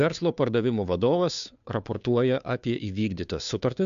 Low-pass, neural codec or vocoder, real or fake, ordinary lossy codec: 7.2 kHz; codec, 16 kHz, 2 kbps, FunCodec, trained on Chinese and English, 25 frames a second; fake; AAC, 96 kbps